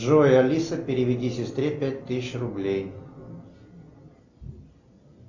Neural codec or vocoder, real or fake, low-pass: none; real; 7.2 kHz